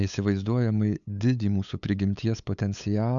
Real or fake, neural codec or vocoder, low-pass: fake; codec, 16 kHz, 8 kbps, FunCodec, trained on LibriTTS, 25 frames a second; 7.2 kHz